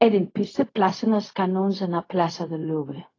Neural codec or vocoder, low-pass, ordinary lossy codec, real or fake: codec, 16 kHz, 0.4 kbps, LongCat-Audio-Codec; 7.2 kHz; AAC, 32 kbps; fake